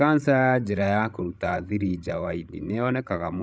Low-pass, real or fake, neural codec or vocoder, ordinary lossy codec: none; fake; codec, 16 kHz, 16 kbps, FreqCodec, larger model; none